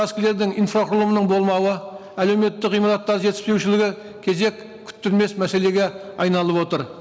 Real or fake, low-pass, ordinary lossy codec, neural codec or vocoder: real; none; none; none